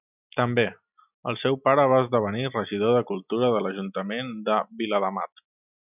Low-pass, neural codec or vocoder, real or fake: 3.6 kHz; none; real